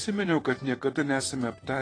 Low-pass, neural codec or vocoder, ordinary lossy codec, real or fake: 9.9 kHz; vocoder, 44.1 kHz, 128 mel bands, Pupu-Vocoder; AAC, 32 kbps; fake